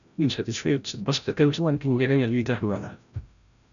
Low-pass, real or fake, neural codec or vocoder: 7.2 kHz; fake; codec, 16 kHz, 0.5 kbps, FreqCodec, larger model